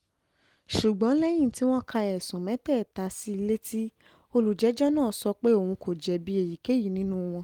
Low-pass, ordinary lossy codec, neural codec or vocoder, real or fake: 19.8 kHz; Opus, 24 kbps; none; real